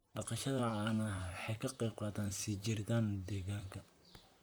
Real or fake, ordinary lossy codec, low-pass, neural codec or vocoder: fake; none; none; vocoder, 44.1 kHz, 128 mel bands, Pupu-Vocoder